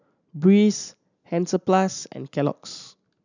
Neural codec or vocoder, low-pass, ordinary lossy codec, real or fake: none; 7.2 kHz; none; real